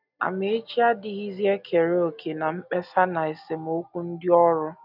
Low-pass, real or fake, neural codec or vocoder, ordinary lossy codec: 5.4 kHz; real; none; none